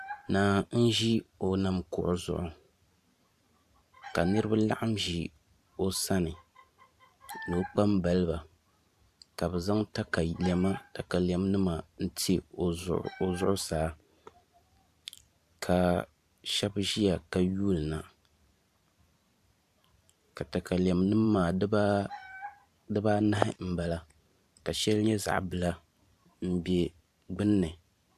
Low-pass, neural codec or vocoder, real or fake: 14.4 kHz; vocoder, 44.1 kHz, 128 mel bands every 512 samples, BigVGAN v2; fake